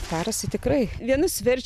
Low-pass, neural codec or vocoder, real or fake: 14.4 kHz; none; real